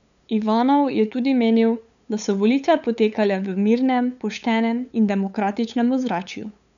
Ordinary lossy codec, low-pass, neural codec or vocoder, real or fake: none; 7.2 kHz; codec, 16 kHz, 8 kbps, FunCodec, trained on LibriTTS, 25 frames a second; fake